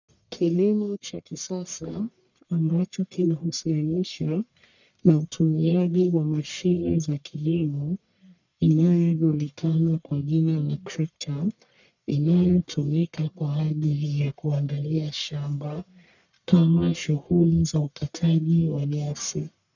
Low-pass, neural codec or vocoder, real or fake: 7.2 kHz; codec, 44.1 kHz, 1.7 kbps, Pupu-Codec; fake